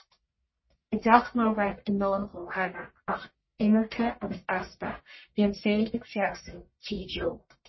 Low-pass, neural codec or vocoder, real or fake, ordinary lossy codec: 7.2 kHz; codec, 44.1 kHz, 1.7 kbps, Pupu-Codec; fake; MP3, 24 kbps